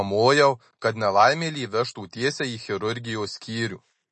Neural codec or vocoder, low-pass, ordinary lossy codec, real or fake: none; 10.8 kHz; MP3, 32 kbps; real